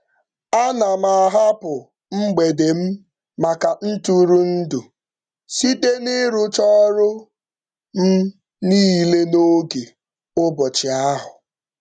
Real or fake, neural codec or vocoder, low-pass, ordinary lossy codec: real; none; 9.9 kHz; none